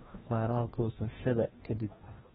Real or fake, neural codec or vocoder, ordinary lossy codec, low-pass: fake; codec, 16 kHz, 1 kbps, FreqCodec, larger model; AAC, 16 kbps; 7.2 kHz